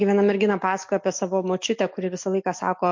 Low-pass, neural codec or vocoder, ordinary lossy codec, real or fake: 7.2 kHz; none; MP3, 48 kbps; real